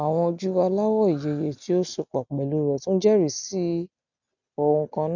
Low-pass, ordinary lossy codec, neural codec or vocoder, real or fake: 7.2 kHz; none; none; real